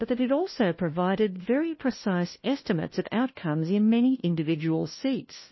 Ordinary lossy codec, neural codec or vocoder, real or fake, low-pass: MP3, 24 kbps; codec, 16 kHz, 1 kbps, FunCodec, trained on LibriTTS, 50 frames a second; fake; 7.2 kHz